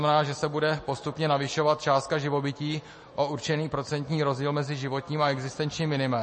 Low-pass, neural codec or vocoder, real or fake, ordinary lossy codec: 10.8 kHz; none; real; MP3, 32 kbps